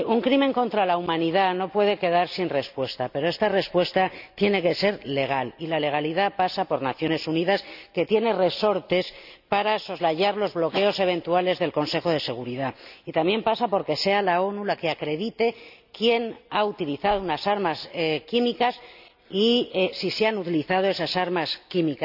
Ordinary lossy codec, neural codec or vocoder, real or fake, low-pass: none; none; real; 5.4 kHz